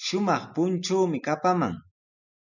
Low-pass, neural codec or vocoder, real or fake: 7.2 kHz; none; real